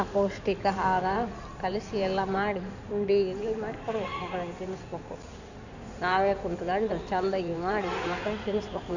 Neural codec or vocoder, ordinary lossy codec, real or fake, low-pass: codec, 16 kHz in and 24 kHz out, 2.2 kbps, FireRedTTS-2 codec; none; fake; 7.2 kHz